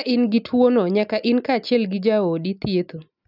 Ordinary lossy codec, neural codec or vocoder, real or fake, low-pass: none; none; real; 5.4 kHz